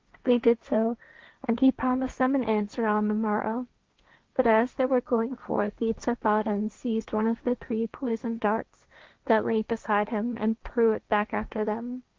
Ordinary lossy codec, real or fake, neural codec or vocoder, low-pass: Opus, 32 kbps; fake; codec, 16 kHz, 1.1 kbps, Voila-Tokenizer; 7.2 kHz